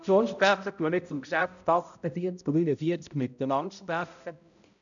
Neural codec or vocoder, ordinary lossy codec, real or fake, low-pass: codec, 16 kHz, 0.5 kbps, X-Codec, HuBERT features, trained on balanced general audio; none; fake; 7.2 kHz